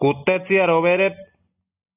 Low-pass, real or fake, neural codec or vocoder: 3.6 kHz; real; none